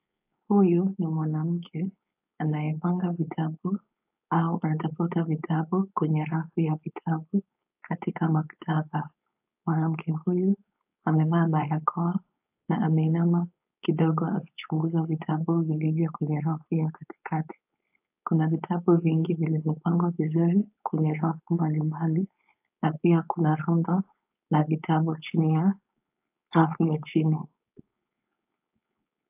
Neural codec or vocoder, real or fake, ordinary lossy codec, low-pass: codec, 16 kHz, 4.8 kbps, FACodec; fake; AAC, 32 kbps; 3.6 kHz